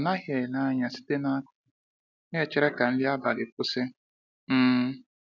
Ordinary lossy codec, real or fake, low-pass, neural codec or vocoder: none; real; 7.2 kHz; none